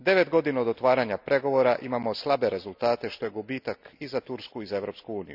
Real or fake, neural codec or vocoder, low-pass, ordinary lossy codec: real; none; 5.4 kHz; none